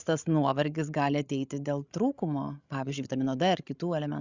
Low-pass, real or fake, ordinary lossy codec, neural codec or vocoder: 7.2 kHz; fake; Opus, 64 kbps; codec, 16 kHz, 16 kbps, FreqCodec, larger model